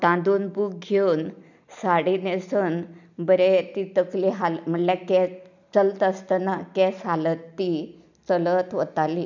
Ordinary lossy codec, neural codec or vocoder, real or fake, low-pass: none; none; real; 7.2 kHz